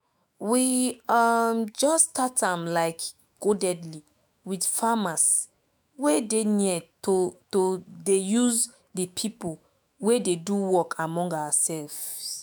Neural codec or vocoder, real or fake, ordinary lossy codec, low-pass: autoencoder, 48 kHz, 128 numbers a frame, DAC-VAE, trained on Japanese speech; fake; none; none